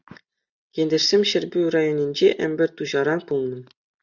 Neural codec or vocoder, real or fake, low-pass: none; real; 7.2 kHz